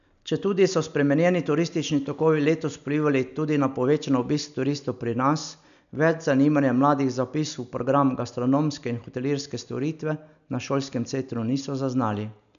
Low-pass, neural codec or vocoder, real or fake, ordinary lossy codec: 7.2 kHz; none; real; none